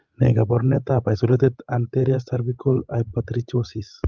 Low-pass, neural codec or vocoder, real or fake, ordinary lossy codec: 7.2 kHz; codec, 16 kHz, 16 kbps, FreqCodec, larger model; fake; Opus, 32 kbps